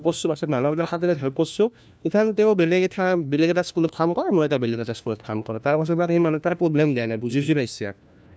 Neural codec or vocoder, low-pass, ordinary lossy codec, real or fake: codec, 16 kHz, 1 kbps, FunCodec, trained on LibriTTS, 50 frames a second; none; none; fake